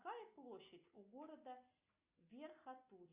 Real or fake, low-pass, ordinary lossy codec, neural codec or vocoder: real; 3.6 kHz; Opus, 64 kbps; none